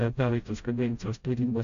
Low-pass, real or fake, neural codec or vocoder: 7.2 kHz; fake; codec, 16 kHz, 0.5 kbps, FreqCodec, smaller model